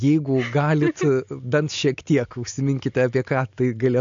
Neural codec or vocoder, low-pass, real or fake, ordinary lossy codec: none; 7.2 kHz; real; MP3, 48 kbps